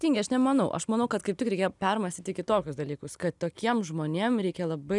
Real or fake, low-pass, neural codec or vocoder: real; 10.8 kHz; none